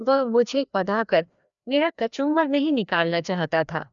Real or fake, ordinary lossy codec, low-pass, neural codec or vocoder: fake; none; 7.2 kHz; codec, 16 kHz, 1 kbps, FreqCodec, larger model